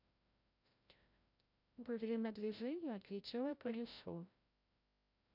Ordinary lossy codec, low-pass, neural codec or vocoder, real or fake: none; 5.4 kHz; codec, 16 kHz, 0.5 kbps, FreqCodec, larger model; fake